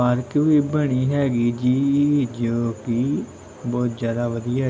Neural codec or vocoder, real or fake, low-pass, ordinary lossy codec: none; real; none; none